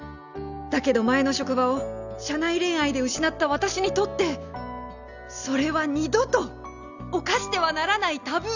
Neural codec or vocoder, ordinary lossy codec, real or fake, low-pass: none; none; real; 7.2 kHz